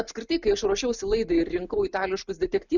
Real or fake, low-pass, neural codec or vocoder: real; 7.2 kHz; none